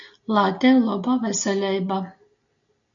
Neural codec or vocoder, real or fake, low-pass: none; real; 7.2 kHz